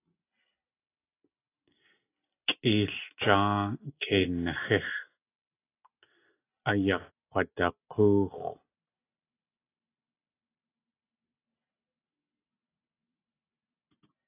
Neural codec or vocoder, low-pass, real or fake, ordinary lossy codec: none; 3.6 kHz; real; AAC, 24 kbps